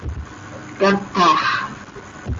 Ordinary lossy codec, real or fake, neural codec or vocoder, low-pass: Opus, 32 kbps; real; none; 7.2 kHz